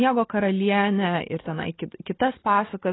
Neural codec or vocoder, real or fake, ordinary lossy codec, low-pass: none; real; AAC, 16 kbps; 7.2 kHz